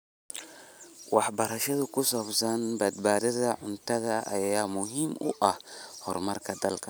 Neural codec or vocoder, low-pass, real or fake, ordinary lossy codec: none; none; real; none